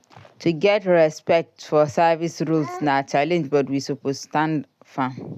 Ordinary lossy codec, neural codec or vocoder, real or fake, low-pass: none; none; real; 14.4 kHz